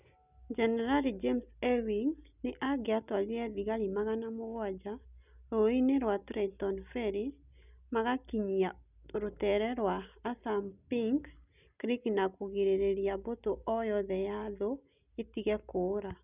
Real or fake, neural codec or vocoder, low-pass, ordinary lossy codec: real; none; 3.6 kHz; none